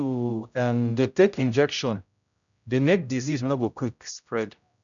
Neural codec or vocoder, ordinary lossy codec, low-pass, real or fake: codec, 16 kHz, 0.5 kbps, X-Codec, HuBERT features, trained on general audio; none; 7.2 kHz; fake